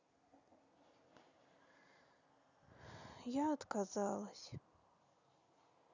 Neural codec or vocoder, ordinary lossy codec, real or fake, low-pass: none; none; real; 7.2 kHz